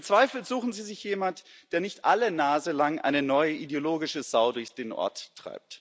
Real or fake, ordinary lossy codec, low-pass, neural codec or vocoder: real; none; none; none